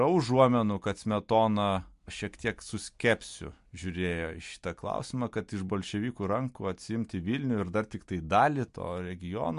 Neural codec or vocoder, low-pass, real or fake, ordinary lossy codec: none; 10.8 kHz; real; MP3, 64 kbps